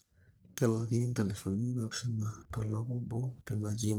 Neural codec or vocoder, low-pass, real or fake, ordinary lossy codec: codec, 44.1 kHz, 1.7 kbps, Pupu-Codec; none; fake; none